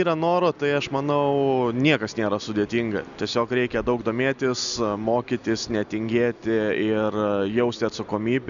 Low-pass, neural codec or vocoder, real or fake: 7.2 kHz; none; real